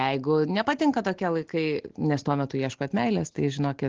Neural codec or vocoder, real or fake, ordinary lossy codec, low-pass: none; real; Opus, 16 kbps; 7.2 kHz